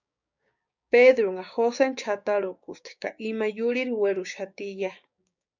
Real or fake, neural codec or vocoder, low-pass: fake; codec, 16 kHz, 6 kbps, DAC; 7.2 kHz